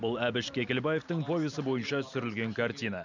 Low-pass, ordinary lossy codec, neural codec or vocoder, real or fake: 7.2 kHz; none; none; real